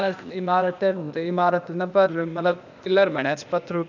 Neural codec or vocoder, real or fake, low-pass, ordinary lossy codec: codec, 16 kHz, 0.8 kbps, ZipCodec; fake; 7.2 kHz; none